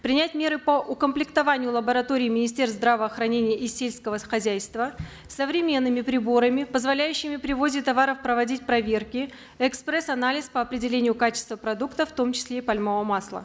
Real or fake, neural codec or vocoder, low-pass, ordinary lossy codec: real; none; none; none